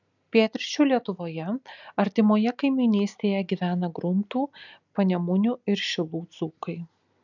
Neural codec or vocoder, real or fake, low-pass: none; real; 7.2 kHz